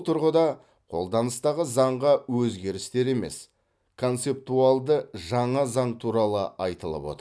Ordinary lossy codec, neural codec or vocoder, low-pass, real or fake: none; none; none; real